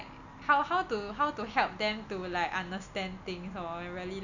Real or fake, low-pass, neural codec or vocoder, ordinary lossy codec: real; 7.2 kHz; none; none